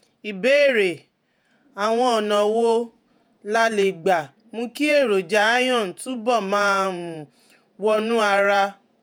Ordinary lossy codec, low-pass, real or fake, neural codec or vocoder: none; none; fake; vocoder, 48 kHz, 128 mel bands, Vocos